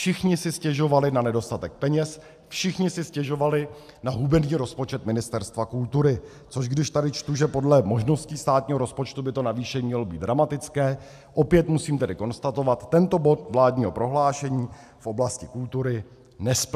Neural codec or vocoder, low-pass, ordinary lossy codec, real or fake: none; 14.4 kHz; AAC, 96 kbps; real